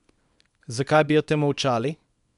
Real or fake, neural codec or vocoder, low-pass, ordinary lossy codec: fake; codec, 24 kHz, 0.9 kbps, WavTokenizer, small release; 10.8 kHz; none